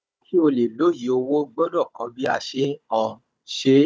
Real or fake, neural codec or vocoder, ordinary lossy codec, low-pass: fake; codec, 16 kHz, 16 kbps, FunCodec, trained on Chinese and English, 50 frames a second; none; none